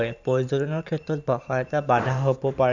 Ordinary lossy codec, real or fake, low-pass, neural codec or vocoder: none; fake; 7.2 kHz; vocoder, 44.1 kHz, 128 mel bands every 512 samples, BigVGAN v2